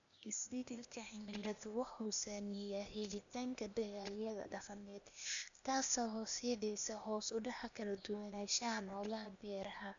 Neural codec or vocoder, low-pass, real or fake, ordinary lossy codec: codec, 16 kHz, 0.8 kbps, ZipCodec; 7.2 kHz; fake; none